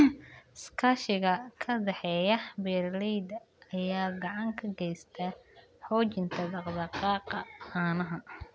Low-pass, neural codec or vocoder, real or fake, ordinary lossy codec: none; none; real; none